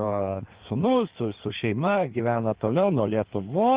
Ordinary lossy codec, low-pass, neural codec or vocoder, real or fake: Opus, 16 kbps; 3.6 kHz; codec, 16 kHz in and 24 kHz out, 1.1 kbps, FireRedTTS-2 codec; fake